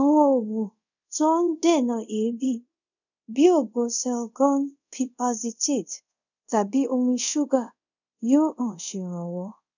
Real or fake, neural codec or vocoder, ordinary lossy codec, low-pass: fake; codec, 24 kHz, 0.5 kbps, DualCodec; none; 7.2 kHz